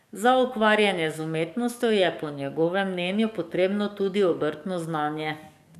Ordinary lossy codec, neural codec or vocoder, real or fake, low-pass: none; codec, 44.1 kHz, 7.8 kbps, DAC; fake; 14.4 kHz